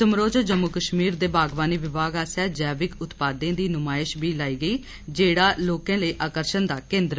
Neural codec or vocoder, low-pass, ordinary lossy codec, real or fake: none; none; none; real